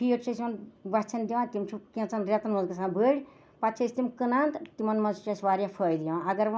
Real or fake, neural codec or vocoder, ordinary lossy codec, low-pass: real; none; none; none